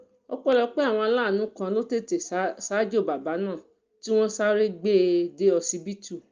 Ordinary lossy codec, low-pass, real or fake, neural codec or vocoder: Opus, 24 kbps; 7.2 kHz; real; none